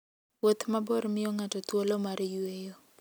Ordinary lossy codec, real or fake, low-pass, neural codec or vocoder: none; real; none; none